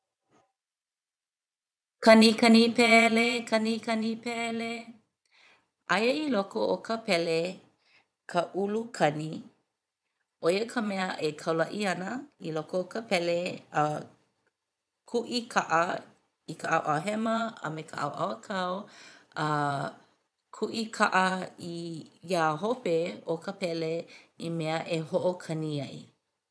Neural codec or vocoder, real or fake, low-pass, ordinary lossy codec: vocoder, 22.05 kHz, 80 mel bands, Vocos; fake; none; none